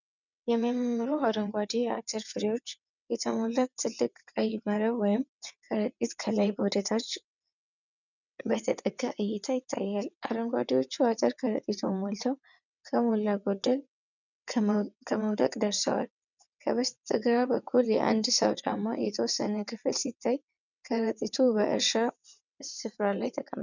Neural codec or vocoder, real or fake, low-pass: vocoder, 22.05 kHz, 80 mel bands, WaveNeXt; fake; 7.2 kHz